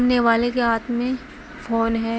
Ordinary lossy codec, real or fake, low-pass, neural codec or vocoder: none; real; none; none